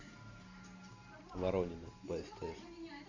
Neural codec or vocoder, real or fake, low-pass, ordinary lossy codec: none; real; 7.2 kHz; AAC, 48 kbps